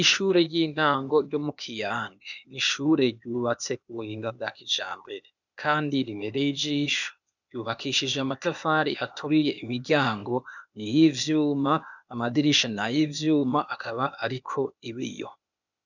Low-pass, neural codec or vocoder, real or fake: 7.2 kHz; codec, 16 kHz, 0.8 kbps, ZipCodec; fake